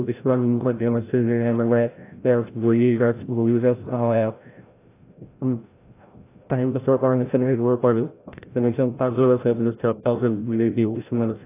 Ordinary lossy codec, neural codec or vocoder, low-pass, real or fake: AAC, 24 kbps; codec, 16 kHz, 0.5 kbps, FreqCodec, larger model; 3.6 kHz; fake